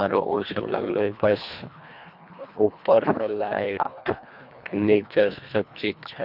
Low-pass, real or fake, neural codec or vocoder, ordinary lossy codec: 5.4 kHz; fake; codec, 24 kHz, 1.5 kbps, HILCodec; none